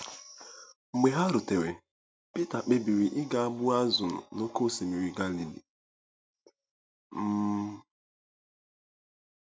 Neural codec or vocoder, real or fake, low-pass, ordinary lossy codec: none; real; none; none